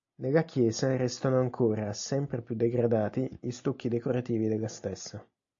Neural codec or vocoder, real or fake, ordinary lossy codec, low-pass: none; real; AAC, 48 kbps; 7.2 kHz